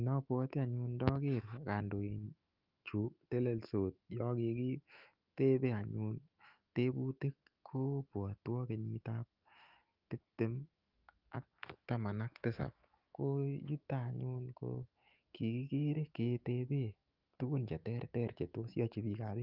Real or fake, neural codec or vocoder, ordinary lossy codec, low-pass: fake; autoencoder, 48 kHz, 128 numbers a frame, DAC-VAE, trained on Japanese speech; Opus, 24 kbps; 5.4 kHz